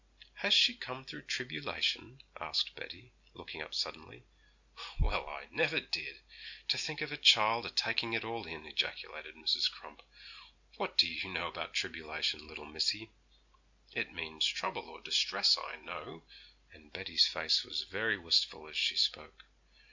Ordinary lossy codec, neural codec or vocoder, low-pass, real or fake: Opus, 64 kbps; none; 7.2 kHz; real